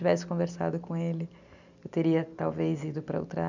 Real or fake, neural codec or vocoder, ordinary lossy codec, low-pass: real; none; none; 7.2 kHz